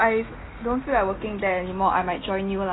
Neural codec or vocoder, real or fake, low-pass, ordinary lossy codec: none; real; 7.2 kHz; AAC, 16 kbps